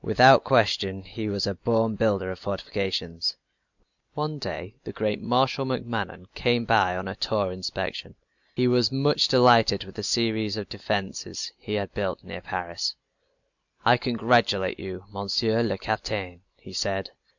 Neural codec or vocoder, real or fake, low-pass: none; real; 7.2 kHz